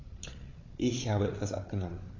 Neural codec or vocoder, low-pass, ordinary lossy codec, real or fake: codec, 16 kHz, 8 kbps, FreqCodec, larger model; 7.2 kHz; AAC, 32 kbps; fake